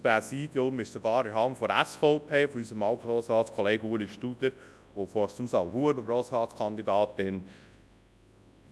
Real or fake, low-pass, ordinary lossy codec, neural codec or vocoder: fake; none; none; codec, 24 kHz, 0.9 kbps, WavTokenizer, large speech release